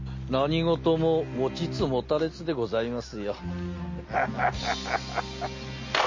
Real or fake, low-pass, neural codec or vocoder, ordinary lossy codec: real; 7.2 kHz; none; MP3, 32 kbps